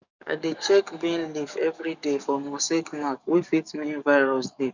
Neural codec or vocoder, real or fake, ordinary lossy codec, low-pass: codec, 44.1 kHz, 7.8 kbps, DAC; fake; none; 7.2 kHz